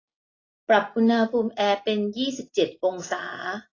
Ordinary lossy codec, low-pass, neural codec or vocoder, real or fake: AAC, 32 kbps; 7.2 kHz; none; real